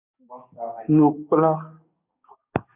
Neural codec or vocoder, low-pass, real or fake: codec, 44.1 kHz, 7.8 kbps, DAC; 3.6 kHz; fake